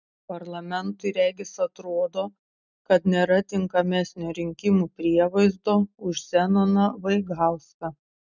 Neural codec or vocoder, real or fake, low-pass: none; real; 7.2 kHz